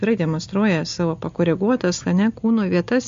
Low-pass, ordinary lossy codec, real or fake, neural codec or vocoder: 7.2 kHz; MP3, 48 kbps; real; none